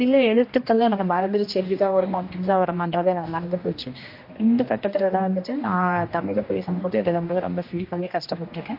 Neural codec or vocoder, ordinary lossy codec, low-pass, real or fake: codec, 16 kHz, 1 kbps, X-Codec, HuBERT features, trained on general audio; MP3, 32 kbps; 5.4 kHz; fake